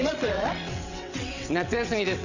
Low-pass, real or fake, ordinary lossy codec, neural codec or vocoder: 7.2 kHz; fake; none; vocoder, 22.05 kHz, 80 mel bands, WaveNeXt